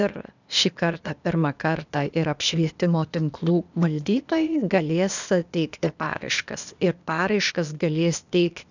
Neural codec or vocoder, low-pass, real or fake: codec, 16 kHz, 0.8 kbps, ZipCodec; 7.2 kHz; fake